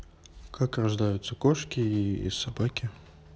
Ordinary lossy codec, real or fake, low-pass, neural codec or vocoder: none; real; none; none